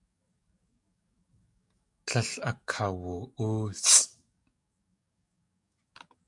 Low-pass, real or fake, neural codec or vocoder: 10.8 kHz; fake; codec, 44.1 kHz, 7.8 kbps, DAC